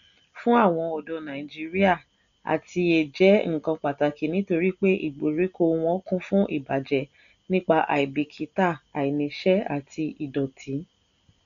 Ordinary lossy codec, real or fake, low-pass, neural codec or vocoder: none; real; 7.2 kHz; none